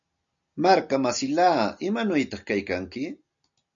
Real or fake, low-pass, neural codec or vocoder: real; 7.2 kHz; none